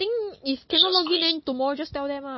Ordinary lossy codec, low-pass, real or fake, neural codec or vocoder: MP3, 24 kbps; 7.2 kHz; real; none